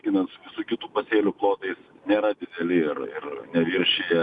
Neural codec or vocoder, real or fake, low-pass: none; real; 10.8 kHz